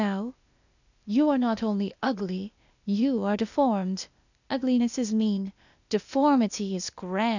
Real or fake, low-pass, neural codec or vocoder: fake; 7.2 kHz; codec, 16 kHz, 0.8 kbps, ZipCodec